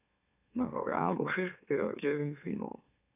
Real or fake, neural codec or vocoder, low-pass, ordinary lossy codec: fake; autoencoder, 44.1 kHz, a latent of 192 numbers a frame, MeloTTS; 3.6 kHz; none